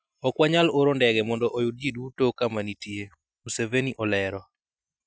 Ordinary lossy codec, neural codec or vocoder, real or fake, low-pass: none; none; real; none